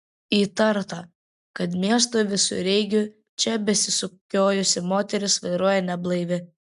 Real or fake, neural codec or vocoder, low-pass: real; none; 10.8 kHz